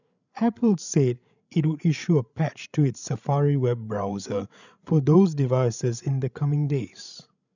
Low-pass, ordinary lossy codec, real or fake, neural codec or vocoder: 7.2 kHz; none; fake; codec, 16 kHz, 16 kbps, FreqCodec, larger model